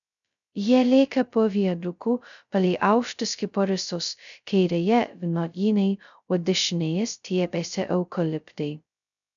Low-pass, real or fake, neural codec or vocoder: 7.2 kHz; fake; codec, 16 kHz, 0.2 kbps, FocalCodec